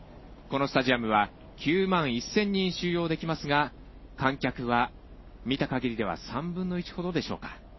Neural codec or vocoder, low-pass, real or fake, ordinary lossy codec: none; 7.2 kHz; real; MP3, 24 kbps